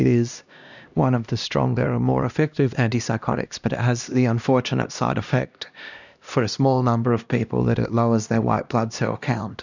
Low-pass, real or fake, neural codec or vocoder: 7.2 kHz; fake; codec, 16 kHz, 1 kbps, X-Codec, HuBERT features, trained on LibriSpeech